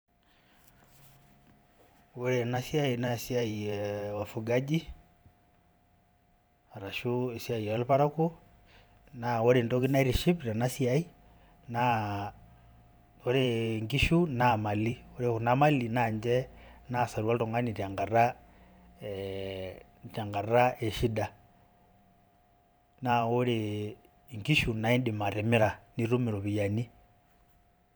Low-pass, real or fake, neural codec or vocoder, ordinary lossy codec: none; fake; vocoder, 44.1 kHz, 128 mel bands every 512 samples, BigVGAN v2; none